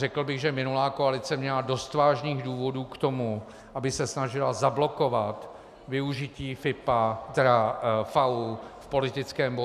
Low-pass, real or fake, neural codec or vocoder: 14.4 kHz; real; none